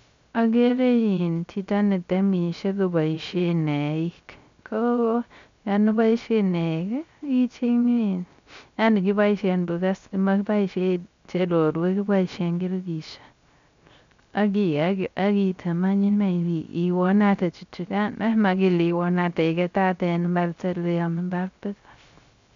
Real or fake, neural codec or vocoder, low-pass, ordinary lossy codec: fake; codec, 16 kHz, 0.3 kbps, FocalCodec; 7.2 kHz; MP3, 64 kbps